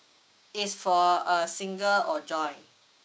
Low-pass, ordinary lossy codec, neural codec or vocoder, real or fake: none; none; codec, 16 kHz, 6 kbps, DAC; fake